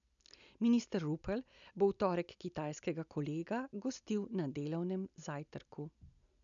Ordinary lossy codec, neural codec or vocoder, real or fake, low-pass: none; none; real; 7.2 kHz